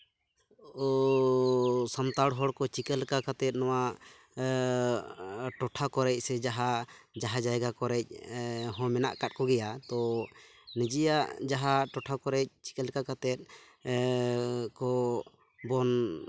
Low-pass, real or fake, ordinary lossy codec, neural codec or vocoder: none; real; none; none